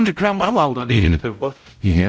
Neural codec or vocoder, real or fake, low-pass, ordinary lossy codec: codec, 16 kHz, 0.5 kbps, X-Codec, WavLM features, trained on Multilingual LibriSpeech; fake; none; none